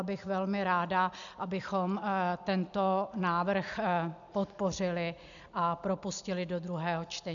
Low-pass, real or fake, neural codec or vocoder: 7.2 kHz; real; none